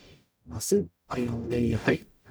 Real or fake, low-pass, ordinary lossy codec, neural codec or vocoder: fake; none; none; codec, 44.1 kHz, 0.9 kbps, DAC